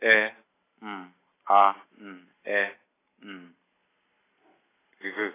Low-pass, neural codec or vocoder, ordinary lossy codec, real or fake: 3.6 kHz; none; AAC, 16 kbps; real